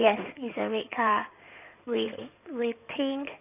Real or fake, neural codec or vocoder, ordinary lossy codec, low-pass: fake; codec, 16 kHz in and 24 kHz out, 2.2 kbps, FireRedTTS-2 codec; none; 3.6 kHz